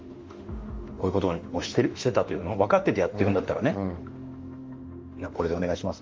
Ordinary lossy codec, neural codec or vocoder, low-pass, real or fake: Opus, 32 kbps; autoencoder, 48 kHz, 32 numbers a frame, DAC-VAE, trained on Japanese speech; 7.2 kHz; fake